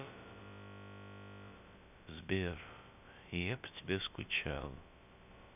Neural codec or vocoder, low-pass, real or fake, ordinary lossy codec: codec, 16 kHz, about 1 kbps, DyCAST, with the encoder's durations; 3.6 kHz; fake; none